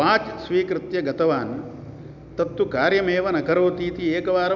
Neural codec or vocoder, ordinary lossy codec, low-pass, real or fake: none; none; 7.2 kHz; real